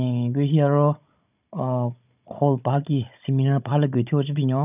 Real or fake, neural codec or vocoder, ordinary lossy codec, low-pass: fake; codec, 16 kHz, 16 kbps, FunCodec, trained on Chinese and English, 50 frames a second; none; 3.6 kHz